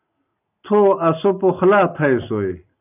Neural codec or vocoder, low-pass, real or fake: none; 3.6 kHz; real